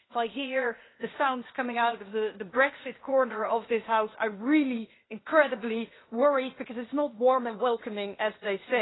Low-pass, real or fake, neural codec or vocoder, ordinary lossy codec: 7.2 kHz; fake; codec, 16 kHz, 0.8 kbps, ZipCodec; AAC, 16 kbps